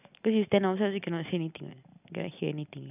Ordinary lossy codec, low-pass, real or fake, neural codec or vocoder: none; 3.6 kHz; real; none